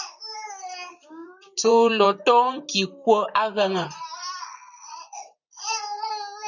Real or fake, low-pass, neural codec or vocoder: fake; 7.2 kHz; vocoder, 44.1 kHz, 128 mel bands, Pupu-Vocoder